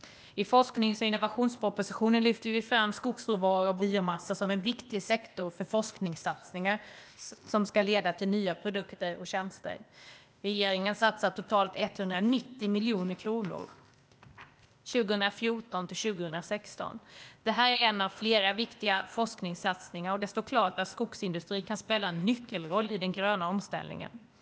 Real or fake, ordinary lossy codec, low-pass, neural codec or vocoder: fake; none; none; codec, 16 kHz, 0.8 kbps, ZipCodec